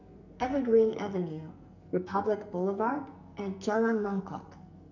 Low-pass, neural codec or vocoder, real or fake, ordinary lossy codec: 7.2 kHz; codec, 44.1 kHz, 2.6 kbps, SNAC; fake; none